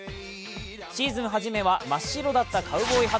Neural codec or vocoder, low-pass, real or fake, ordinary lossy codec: none; none; real; none